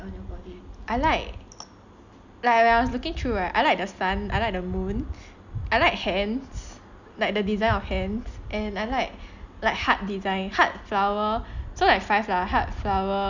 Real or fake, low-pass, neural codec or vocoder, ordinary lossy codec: real; 7.2 kHz; none; none